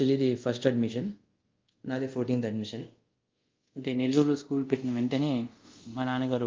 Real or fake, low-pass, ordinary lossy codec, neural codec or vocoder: fake; 7.2 kHz; Opus, 32 kbps; codec, 24 kHz, 0.5 kbps, DualCodec